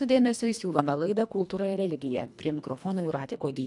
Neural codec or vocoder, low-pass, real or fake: codec, 24 kHz, 1.5 kbps, HILCodec; 10.8 kHz; fake